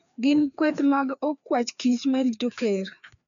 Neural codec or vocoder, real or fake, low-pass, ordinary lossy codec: codec, 16 kHz, 2 kbps, FreqCodec, larger model; fake; 7.2 kHz; none